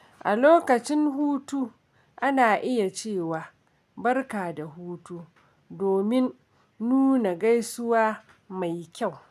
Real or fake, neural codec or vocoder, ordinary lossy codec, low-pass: real; none; none; 14.4 kHz